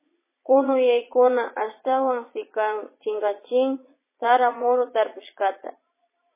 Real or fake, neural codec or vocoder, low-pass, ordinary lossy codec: fake; vocoder, 22.05 kHz, 80 mel bands, Vocos; 3.6 kHz; MP3, 16 kbps